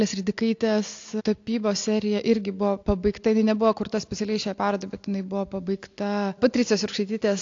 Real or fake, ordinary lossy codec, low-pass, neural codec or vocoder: real; AAC, 48 kbps; 7.2 kHz; none